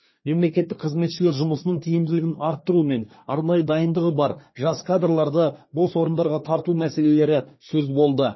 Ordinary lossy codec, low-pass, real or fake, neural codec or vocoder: MP3, 24 kbps; 7.2 kHz; fake; codec, 24 kHz, 1 kbps, SNAC